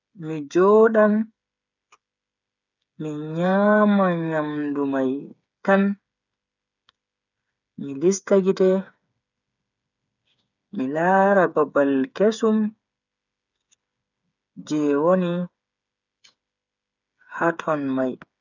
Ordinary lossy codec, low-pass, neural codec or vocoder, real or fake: none; 7.2 kHz; codec, 16 kHz, 8 kbps, FreqCodec, smaller model; fake